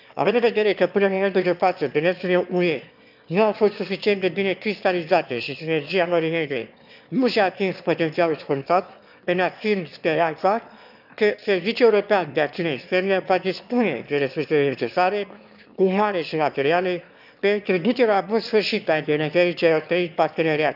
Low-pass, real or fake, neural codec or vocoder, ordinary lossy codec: 5.4 kHz; fake; autoencoder, 22.05 kHz, a latent of 192 numbers a frame, VITS, trained on one speaker; none